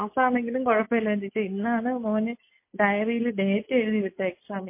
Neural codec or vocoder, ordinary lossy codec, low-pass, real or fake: none; MP3, 24 kbps; 3.6 kHz; real